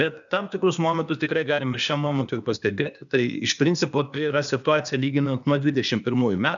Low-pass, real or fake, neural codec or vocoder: 7.2 kHz; fake; codec, 16 kHz, 0.8 kbps, ZipCodec